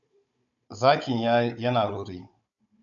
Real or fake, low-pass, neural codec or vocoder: fake; 7.2 kHz; codec, 16 kHz, 16 kbps, FunCodec, trained on Chinese and English, 50 frames a second